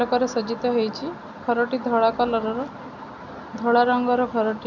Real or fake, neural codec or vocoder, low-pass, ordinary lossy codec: real; none; 7.2 kHz; none